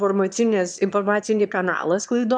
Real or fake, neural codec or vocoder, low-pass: fake; autoencoder, 22.05 kHz, a latent of 192 numbers a frame, VITS, trained on one speaker; 9.9 kHz